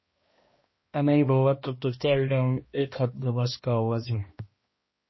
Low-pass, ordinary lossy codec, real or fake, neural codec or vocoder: 7.2 kHz; MP3, 24 kbps; fake; codec, 16 kHz, 1 kbps, X-Codec, HuBERT features, trained on balanced general audio